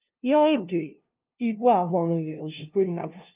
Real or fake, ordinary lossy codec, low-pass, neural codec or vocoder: fake; Opus, 24 kbps; 3.6 kHz; codec, 16 kHz, 0.5 kbps, FunCodec, trained on LibriTTS, 25 frames a second